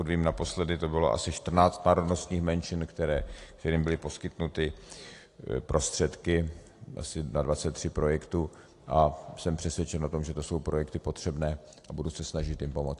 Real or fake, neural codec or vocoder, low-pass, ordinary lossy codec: real; none; 10.8 kHz; AAC, 48 kbps